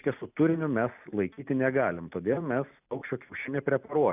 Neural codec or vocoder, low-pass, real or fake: none; 3.6 kHz; real